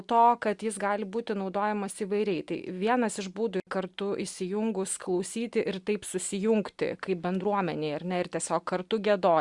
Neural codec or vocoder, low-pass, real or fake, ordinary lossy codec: none; 10.8 kHz; real; Opus, 64 kbps